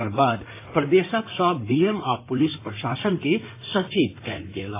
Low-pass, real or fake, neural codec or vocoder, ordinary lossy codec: 3.6 kHz; fake; codec, 16 kHz in and 24 kHz out, 2.2 kbps, FireRedTTS-2 codec; AAC, 24 kbps